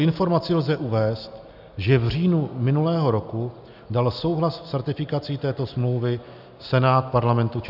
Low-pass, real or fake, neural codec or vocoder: 5.4 kHz; real; none